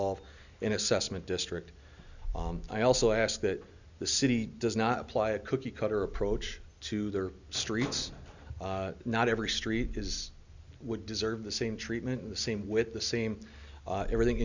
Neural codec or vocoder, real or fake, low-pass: none; real; 7.2 kHz